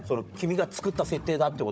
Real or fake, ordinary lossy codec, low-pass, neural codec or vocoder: fake; none; none; codec, 16 kHz, 16 kbps, FunCodec, trained on Chinese and English, 50 frames a second